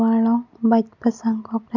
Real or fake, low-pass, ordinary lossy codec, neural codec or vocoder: real; 7.2 kHz; none; none